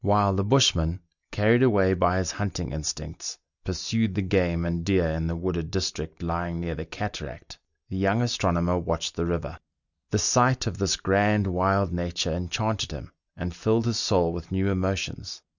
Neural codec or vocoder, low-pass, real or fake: none; 7.2 kHz; real